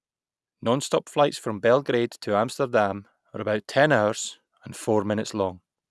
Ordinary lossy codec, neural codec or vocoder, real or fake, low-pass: none; none; real; none